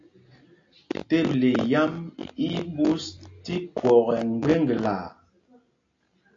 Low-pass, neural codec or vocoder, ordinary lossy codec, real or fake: 7.2 kHz; none; AAC, 48 kbps; real